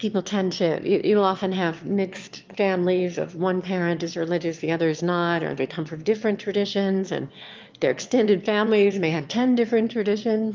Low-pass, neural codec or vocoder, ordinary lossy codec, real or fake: 7.2 kHz; autoencoder, 22.05 kHz, a latent of 192 numbers a frame, VITS, trained on one speaker; Opus, 24 kbps; fake